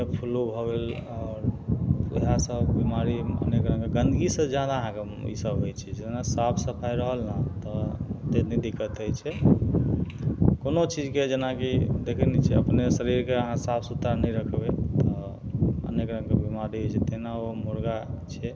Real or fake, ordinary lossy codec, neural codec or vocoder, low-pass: real; none; none; none